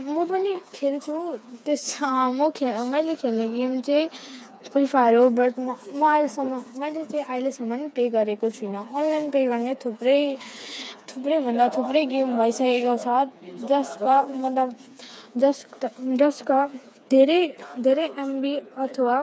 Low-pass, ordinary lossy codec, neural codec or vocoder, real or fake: none; none; codec, 16 kHz, 4 kbps, FreqCodec, smaller model; fake